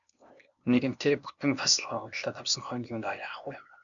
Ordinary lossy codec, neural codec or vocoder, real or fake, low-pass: MP3, 96 kbps; codec, 16 kHz, 0.8 kbps, ZipCodec; fake; 7.2 kHz